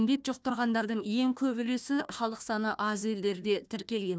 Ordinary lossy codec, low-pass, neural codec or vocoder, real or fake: none; none; codec, 16 kHz, 1 kbps, FunCodec, trained on Chinese and English, 50 frames a second; fake